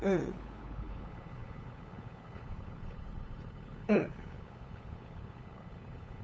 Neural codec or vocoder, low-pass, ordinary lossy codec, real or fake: codec, 16 kHz, 8 kbps, FunCodec, trained on LibriTTS, 25 frames a second; none; none; fake